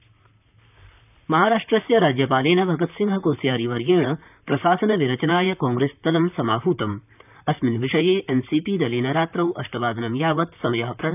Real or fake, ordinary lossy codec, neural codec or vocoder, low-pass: fake; none; vocoder, 44.1 kHz, 128 mel bands, Pupu-Vocoder; 3.6 kHz